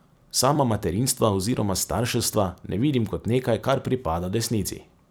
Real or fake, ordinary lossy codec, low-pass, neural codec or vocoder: fake; none; none; vocoder, 44.1 kHz, 128 mel bands every 256 samples, BigVGAN v2